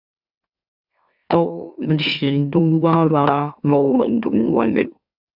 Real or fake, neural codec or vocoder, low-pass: fake; autoencoder, 44.1 kHz, a latent of 192 numbers a frame, MeloTTS; 5.4 kHz